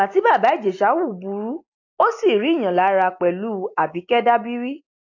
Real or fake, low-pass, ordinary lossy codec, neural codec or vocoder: real; 7.2 kHz; AAC, 48 kbps; none